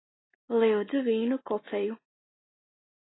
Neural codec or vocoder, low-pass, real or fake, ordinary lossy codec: none; 7.2 kHz; real; AAC, 16 kbps